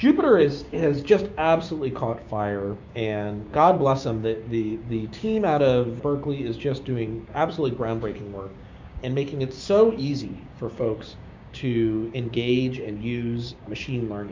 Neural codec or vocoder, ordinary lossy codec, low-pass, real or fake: codec, 16 kHz, 6 kbps, DAC; MP3, 64 kbps; 7.2 kHz; fake